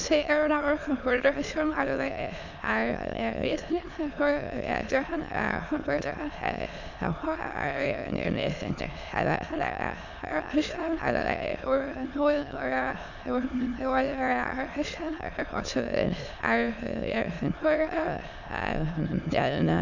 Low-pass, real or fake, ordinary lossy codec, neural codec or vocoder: 7.2 kHz; fake; none; autoencoder, 22.05 kHz, a latent of 192 numbers a frame, VITS, trained on many speakers